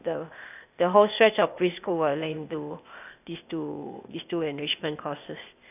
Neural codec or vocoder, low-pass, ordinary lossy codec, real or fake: codec, 16 kHz, 0.8 kbps, ZipCodec; 3.6 kHz; none; fake